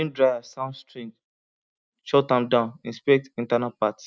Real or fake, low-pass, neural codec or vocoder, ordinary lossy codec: real; none; none; none